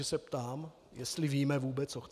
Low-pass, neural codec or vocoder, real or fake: 14.4 kHz; none; real